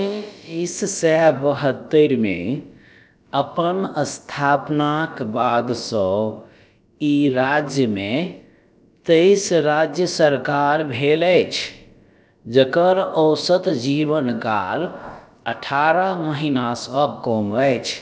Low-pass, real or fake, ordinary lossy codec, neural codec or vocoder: none; fake; none; codec, 16 kHz, about 1 kbps, DyCAST, with the encoder's durations